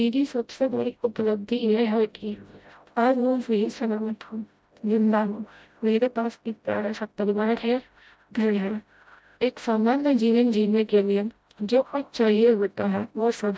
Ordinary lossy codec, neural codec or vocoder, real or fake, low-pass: none; codec, 16 kHz, 0.5 kbps, FreqCodec, smaller model; fake; none